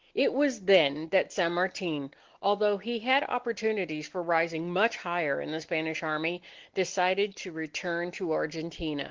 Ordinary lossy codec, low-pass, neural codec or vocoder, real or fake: Opus, 16 kbps; 7.2 kHz; none; real